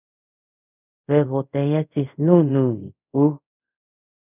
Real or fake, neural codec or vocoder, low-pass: fake; codec, 24 kHz, 0.5 kbps, DualCodec; 3.6 kHz